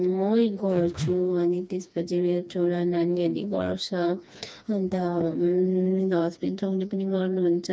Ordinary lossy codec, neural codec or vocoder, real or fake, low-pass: none; codec, 16 kHz, 2 kbps, FreqCodec, smaller model; fake; none